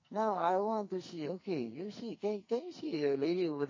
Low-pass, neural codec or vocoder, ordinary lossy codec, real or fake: 7.2 kHz; codec, 44.1 kHz, 2.6 kbps, SNAC; MP3, 32 kbps; fake